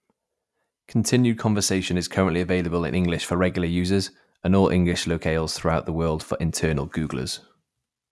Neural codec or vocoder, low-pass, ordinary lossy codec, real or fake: none; none; none; real